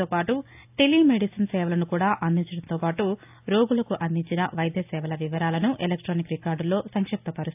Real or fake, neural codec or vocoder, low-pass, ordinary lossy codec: real; none; 3.6 kHz; AAC, 32 kbps